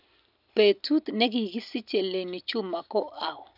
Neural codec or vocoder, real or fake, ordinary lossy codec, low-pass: none; real; none; 5.4 kHz